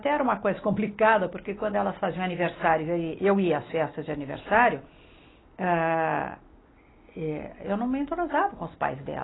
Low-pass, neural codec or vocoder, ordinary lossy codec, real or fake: 7.2 kHz; none; AAC, 16 kbps; real